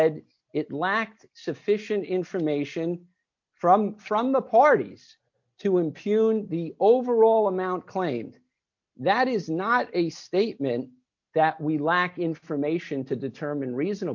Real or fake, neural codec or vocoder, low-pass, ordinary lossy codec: real; none; 7.2 kHz; MP3, 48 kbps